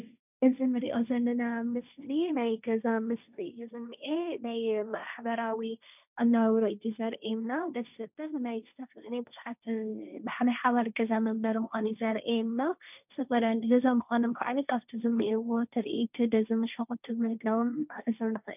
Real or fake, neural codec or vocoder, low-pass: fake; codec, 16 kHz, 1.1 kbps, Voila-Tokenizer; 3.6 kHz